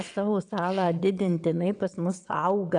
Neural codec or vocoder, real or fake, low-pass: vocoder, 22.05 kHz, 80 mel bands, WaveNeXt; fake; 9.9 kHz